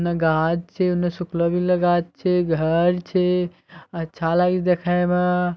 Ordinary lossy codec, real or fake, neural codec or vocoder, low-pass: none; real; none; none